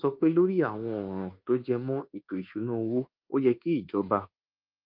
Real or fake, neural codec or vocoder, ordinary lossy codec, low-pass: fake; codec, 24 kHz, 1.2 kbps, DualCodec; Opus, 32 kbps; 5.4 kHz